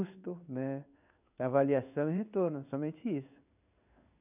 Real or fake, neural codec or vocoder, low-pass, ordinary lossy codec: fake; codec, 16 kHz in and 24 kHz out, 1 kbps, XY-Tokenizer; 3.6 kHz; none